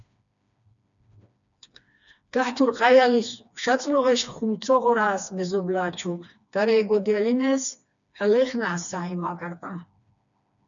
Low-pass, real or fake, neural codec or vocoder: 7.2 kHz; fake; codec, 16 kHz, 2 kbps, FreqCodec, smaller model